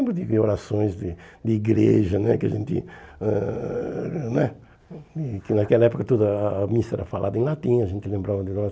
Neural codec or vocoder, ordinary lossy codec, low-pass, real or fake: none; none; none; real